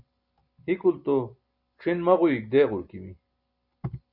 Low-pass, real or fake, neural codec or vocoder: 5.4 kHz; real; none